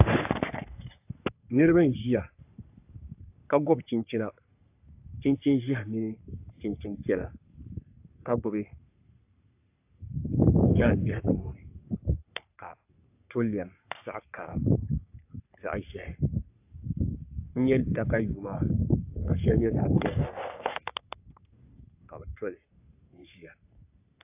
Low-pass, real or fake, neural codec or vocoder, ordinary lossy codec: 3.6 kHz; fake; codec, 44.1 kHz, 3.4 kbps, Pupu-Codec; AAC, 32 kbps